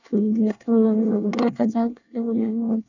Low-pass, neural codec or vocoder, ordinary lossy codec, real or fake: 7.2 kHz; codec, 24 kHz, 1 kbps, SNAC; none; fake